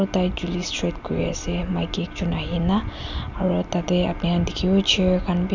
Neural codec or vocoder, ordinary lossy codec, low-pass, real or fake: none; none; 7.2 kHz; real